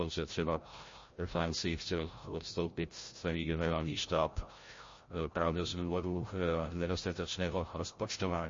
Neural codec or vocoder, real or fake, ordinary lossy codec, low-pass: codec, 16 kHz, 0.5 kbps, FreqCodec, larger model; fake; MP3, 32 kbps; 7.2 kHz